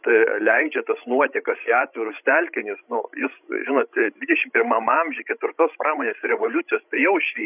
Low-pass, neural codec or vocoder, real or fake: 3.6 kHz; codec, 16 kHz, 16 kbps, FreqCodec, larger model; fake